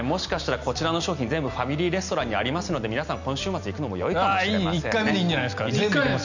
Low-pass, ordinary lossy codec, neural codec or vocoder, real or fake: 7.2 kHz; none; none; real